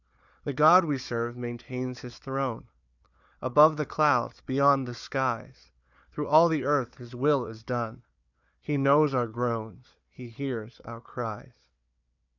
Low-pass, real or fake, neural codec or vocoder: 7.2 kHz; fake; codec, 16 kHz, 4 kbps, FunCodec, trained on Chinese and English, 50 frames a second